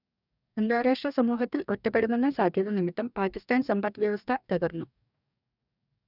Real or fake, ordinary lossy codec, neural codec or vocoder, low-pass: fake; none; codec, 44.1 kHz, 2.6 kbps, DAC; 5.4 kHz